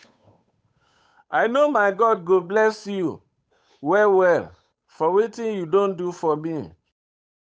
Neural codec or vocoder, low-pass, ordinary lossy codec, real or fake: codec, 16 kHz, 8 kbps, FunCodec, trained on Chinese and English, 25 frames a second; none; none; fake